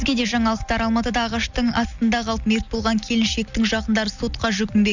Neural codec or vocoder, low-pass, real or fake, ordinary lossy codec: none; 7.2 kHz; real; none